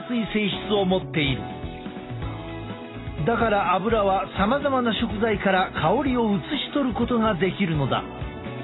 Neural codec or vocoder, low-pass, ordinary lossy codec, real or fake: none; 7.2 kHz; AAC, 16 kbps; real